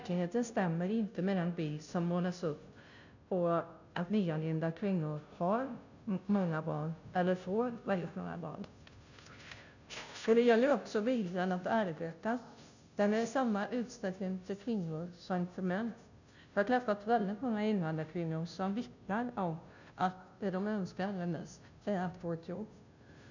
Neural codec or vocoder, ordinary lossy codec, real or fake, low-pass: codec, 16 kHz, 0.5 kbps, FunCodec, trained on Chinese and English, 25 frames a second; none; fake; 7.2 kHz